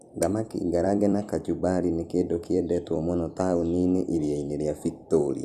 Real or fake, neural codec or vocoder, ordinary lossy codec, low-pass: fake; vocoder, 44.1 kHz, 128 mel bands every 256 samples, BigVGAN v2; Opus, 64 kbps; 14.4 kHz